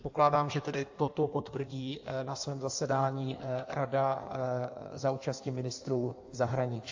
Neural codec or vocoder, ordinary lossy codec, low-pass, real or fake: codec, 16 kHz in and 24 kHz out, 1.1 kbps, FireRedTTS-2 codec; AAC, 48 kbps; 7.2 kHz; fake